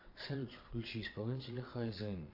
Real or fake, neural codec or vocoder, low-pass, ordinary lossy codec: fake; vocoder, 22.05 kHz, 80 mel bands, Vocos; 5.4 kHz; AAC, 32 kbps